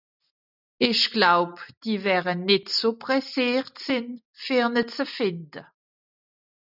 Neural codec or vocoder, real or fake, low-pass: none; real; 5.4 kHz